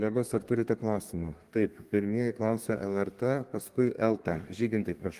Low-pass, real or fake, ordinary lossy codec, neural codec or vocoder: 14.4 kHz; fake; Opus, 24 kbps; codec, 32 kHz, 1.9 kbps, SNAC